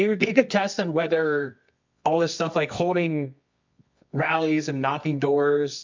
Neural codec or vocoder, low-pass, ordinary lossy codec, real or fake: codec, 24 kHz, 0.9 kbps, WavTokenizer, medium music audio release; 7.2 kHz; MP3, 64 kbps; fake